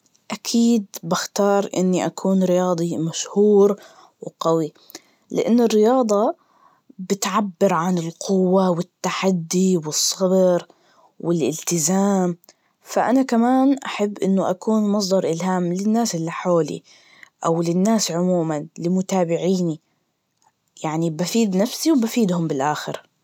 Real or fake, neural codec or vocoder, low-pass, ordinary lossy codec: real; none; 19.8 kHz; none